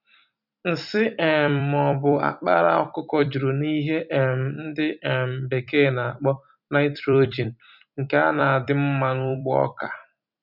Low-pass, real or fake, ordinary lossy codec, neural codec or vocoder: 5.4 kHz; fake; none; vocoder, 44.1 kHz, 128 mel bands every 256 samples, BigVGAN v2